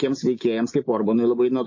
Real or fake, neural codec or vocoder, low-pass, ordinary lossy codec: real; none; 7.2 kHz; MP3, 32 kbps